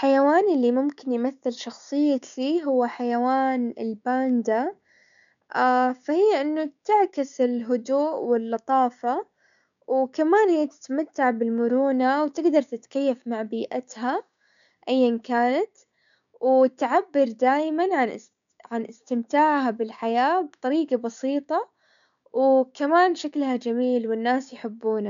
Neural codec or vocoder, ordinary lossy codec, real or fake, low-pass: codec, 16 kHz, 6 kbps, DAC; none; fake; 7.2 kHz